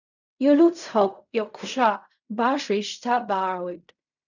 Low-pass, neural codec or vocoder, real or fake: 7.2 kHz; codec, 16 kHz in and 24 kHz out, 0.4 kbps, LongCat-Audio-Codec, fine tuned four codebook decoder; fake